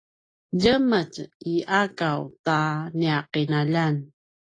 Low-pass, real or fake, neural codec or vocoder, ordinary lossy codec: 9.9 kHz; real; none; AAC, 32 kbps